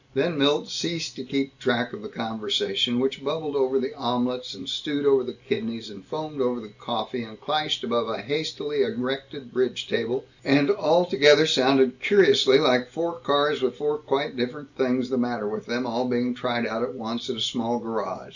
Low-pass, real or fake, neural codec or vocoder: 7.2 kHz; real; none